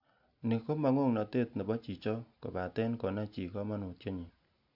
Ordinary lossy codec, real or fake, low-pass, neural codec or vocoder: MP3, 48 kbps; real; 5.4 kHz; none